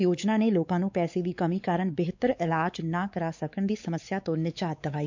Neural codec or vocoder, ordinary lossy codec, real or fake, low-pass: codec, 16 kHz, 4 kbps, X-Codec, WavLM features, trained on Multilingual LibriSpeech; MP3, 64 kbps; fake; 7.2 kHz